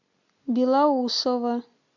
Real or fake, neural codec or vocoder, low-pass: real; none; 7.2 kHz